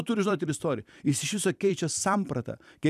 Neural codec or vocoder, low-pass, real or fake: vocoder, 44.1 kHz, 128 mel bands every 256 samples, BigVGAN v2; 14.4 kHz; fake